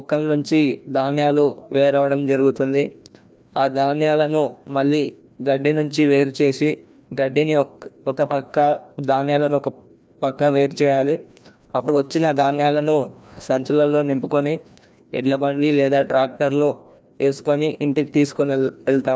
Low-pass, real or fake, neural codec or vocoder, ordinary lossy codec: none; fake; codec, 16 kHz, 1 kbps, FreqCodec, larger model; none